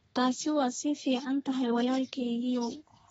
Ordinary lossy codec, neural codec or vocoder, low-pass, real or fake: AAC, 24 kbps; codec, 32 kHz, 1.9 kbps, SNAC; 14.4 kHz; fake